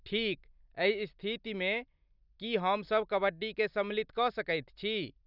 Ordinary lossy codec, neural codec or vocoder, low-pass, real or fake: none; none; 5.4 kHz; real